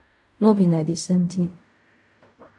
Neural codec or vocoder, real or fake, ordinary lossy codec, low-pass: codec, 16 kHz in and 24 kHz out, 0.4 kbps, LongCat-Audio-Codec, fine tuned four codebook decoder; fake; AAC, 64 kbps; 10.8 kHz